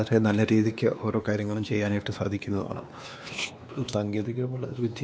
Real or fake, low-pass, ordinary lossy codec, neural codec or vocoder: fake; none; none; codec, 16 kHz, 2 kbps, X-Codec, WavLM features, trained on Multilingual LibriSpeech